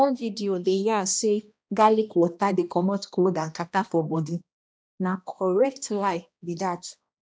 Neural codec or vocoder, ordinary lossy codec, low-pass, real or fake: codec, 16 kHz, 1 kbps, X-Codec, HuBERT features, trained on balanced general audio; none; none; fake